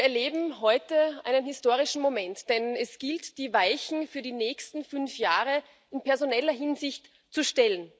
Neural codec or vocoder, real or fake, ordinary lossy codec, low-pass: none; real; none; none